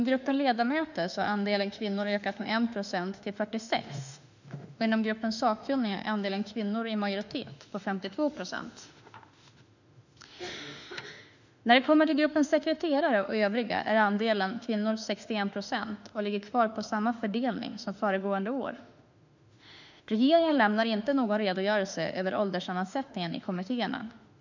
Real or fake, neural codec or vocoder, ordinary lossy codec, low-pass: fake; autoencoder, 48 kHz, 32 numbers a frame, DAC-VAE, trained on Japanese speech; none; 7.2 kHz